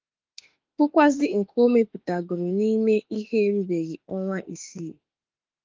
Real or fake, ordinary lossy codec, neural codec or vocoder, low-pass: fake; Opus, 32 kbps; autoencoder, 48 kHz, 32 numbers a frame, DAC-VAE, trained on Japanese speech; 7.2 kHz